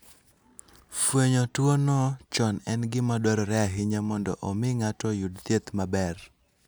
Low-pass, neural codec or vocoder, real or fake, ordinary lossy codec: none; none; real; none